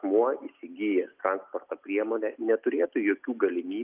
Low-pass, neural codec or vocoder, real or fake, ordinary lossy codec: 3.6 kHz; none; real; Opus, 24 kbps